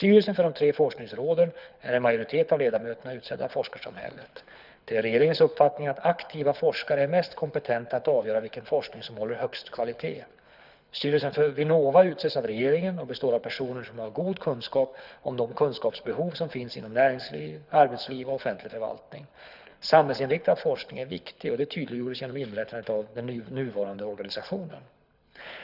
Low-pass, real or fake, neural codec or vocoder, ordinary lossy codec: 5.4 kHz; fake; codec, 24 kHz, 6 kbps, HILCodec; none